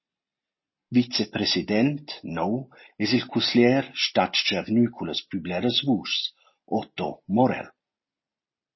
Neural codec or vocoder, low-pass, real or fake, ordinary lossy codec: none; 7.2 kHz; real; MP3, 24 kbps